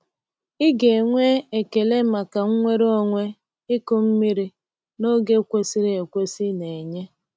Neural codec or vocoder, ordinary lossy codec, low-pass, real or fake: none; none; none; real